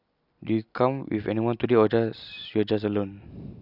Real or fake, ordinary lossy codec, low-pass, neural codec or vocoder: real; none; 5.4 kHz; none